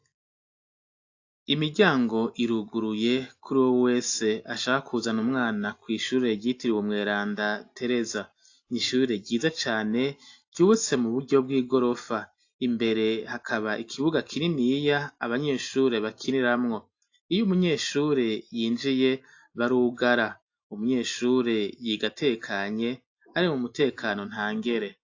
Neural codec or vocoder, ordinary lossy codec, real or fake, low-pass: none; AAC, 48 kbps; real; 7.2 kHz